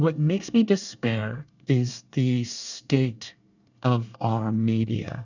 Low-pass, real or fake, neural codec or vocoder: 7.2 kHz; fake; codec, 24 kHz, 1 kbps, SNAC